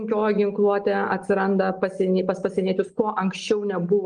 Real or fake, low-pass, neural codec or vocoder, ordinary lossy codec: real; 9.9 kHz; none; Opus, 24 kbps